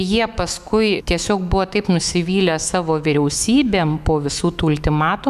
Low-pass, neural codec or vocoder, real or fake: 14.4 kHz; autoencoder, 48 kHz, 128 numbers a frame, DAC-VAE, trained on Japanese speech; fake